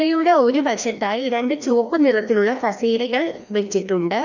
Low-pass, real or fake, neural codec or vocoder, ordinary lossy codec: 7.2 kHz; fake; codec, 16 kHz, 1 kbps, FreqCodec, larger model; none